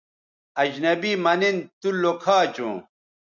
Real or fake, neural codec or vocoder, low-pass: real; none; 7.2 kHz